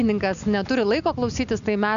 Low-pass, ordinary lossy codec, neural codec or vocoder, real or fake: 7.2 kHz; MP3, 96 kbps; none; real